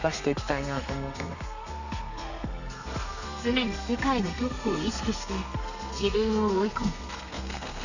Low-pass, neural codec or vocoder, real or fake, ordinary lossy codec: 7.2 kHz; codec, 32 kHz, 1.9 kbps, SNAC; fake; none